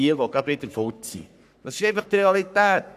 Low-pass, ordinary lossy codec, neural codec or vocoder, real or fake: 14.4 kHz; none; codec, 44.1 kHz, 3.4 kbps, Pupu-Codec; fake